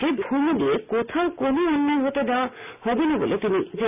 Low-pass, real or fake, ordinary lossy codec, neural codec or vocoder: 3.6 kHz; real; none; none